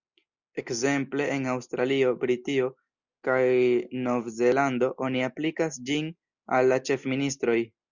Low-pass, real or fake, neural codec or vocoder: 7.2 kHz; real; none